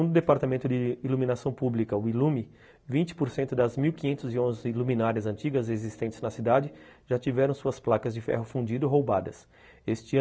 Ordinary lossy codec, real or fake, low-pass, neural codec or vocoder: none; real; none; none